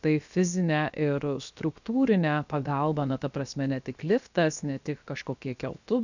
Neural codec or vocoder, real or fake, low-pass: codec, 16 kHz, 0.7 kbps, FocalCodec; fake; 7.2 kHz